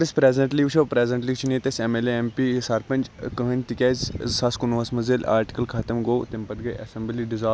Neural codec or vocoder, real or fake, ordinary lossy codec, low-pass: none; real; none; none